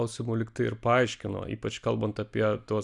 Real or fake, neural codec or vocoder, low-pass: real; none; 10.8 kHz